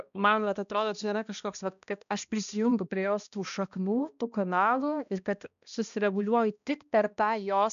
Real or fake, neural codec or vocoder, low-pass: fake; codec, 16 kHz, 1 kbps, X-Codec, HuBERT features, trained on balanced general audio; 7.2 kHz